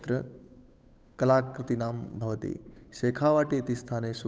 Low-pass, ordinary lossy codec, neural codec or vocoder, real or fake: none; none; codec, 16 kHz, 8 kbps, FunCodec, trained on Chinese and English, 25 frames a second; fake